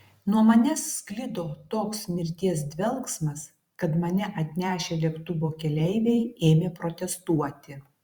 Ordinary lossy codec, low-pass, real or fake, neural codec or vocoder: Opus, 64 kbps; 19.8 kHz; fake; vocoder, 44.1 kHz, 128 mel bands every 512 samples, BigVGAN v2